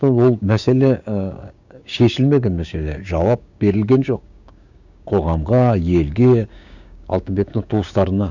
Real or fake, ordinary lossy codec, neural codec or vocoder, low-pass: fake; none; codec, 16 kHz, 6 kbps, DAC; 7.2 kHz